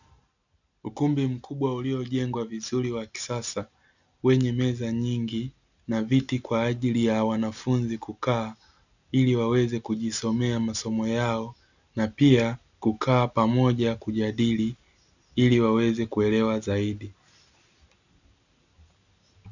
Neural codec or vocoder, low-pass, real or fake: none; 7.2 kHz; real